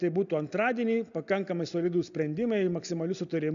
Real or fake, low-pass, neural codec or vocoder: real; 7.2 kHz; none